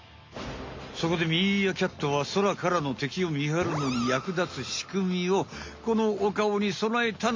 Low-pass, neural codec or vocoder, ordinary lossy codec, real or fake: 7.2 kHz; none; MP3, 48 kbps; real